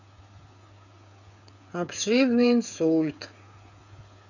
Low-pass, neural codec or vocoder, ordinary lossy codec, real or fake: 7.2 kHz; codec, 16 kHz, 8 kbps, FreqCodec, smaller model; none; fake